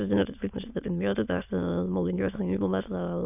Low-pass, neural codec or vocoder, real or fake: 3.6 kHz; autoencoder, 22.05 kHz, a latent of 192 numbers a frame, VITS, trained on many speakers; fake